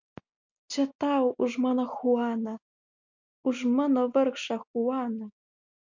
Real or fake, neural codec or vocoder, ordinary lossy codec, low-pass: real; none; MP3, 48 kbps; 7.2 kHz